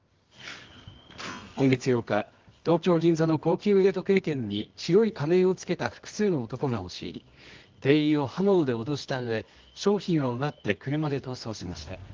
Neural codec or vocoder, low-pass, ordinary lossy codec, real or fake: codec, 24 kHz, 0.9 kbps, WavTokenizer, medium music audio release; 7.2 kHz; Opus, 32 kbps; fake